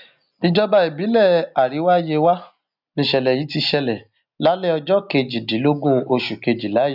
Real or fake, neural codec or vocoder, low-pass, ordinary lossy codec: real; none; 5.4 kHz; none